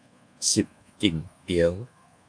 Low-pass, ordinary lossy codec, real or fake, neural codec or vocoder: 9.9 kHz; AAC, 64 kbps; fake; codec, 24 kHz, 1.2 kbps, DualCodec